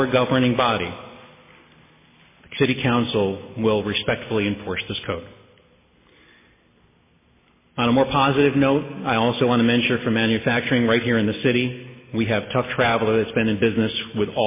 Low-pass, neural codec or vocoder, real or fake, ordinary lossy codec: 3.6 kHz; none; real; MP3, 16 kbps